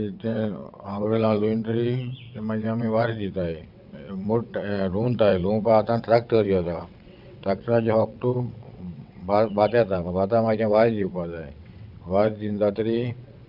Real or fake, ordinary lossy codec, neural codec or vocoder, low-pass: fake; none; vocoder, 22.05 kHz, 80 mel bands, WaveNeXt; 5.4 kHz